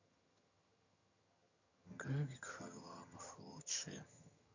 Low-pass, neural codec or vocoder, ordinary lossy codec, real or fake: 7.2 kHz; vocoder, 22.05 kHz, 80 mel bands, HiFi-GAN; none; fake